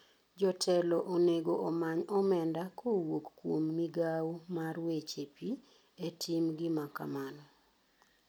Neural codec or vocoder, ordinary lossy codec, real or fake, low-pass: none; none; real; none